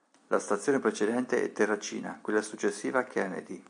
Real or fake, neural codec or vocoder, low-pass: real; none; 9.9 kHz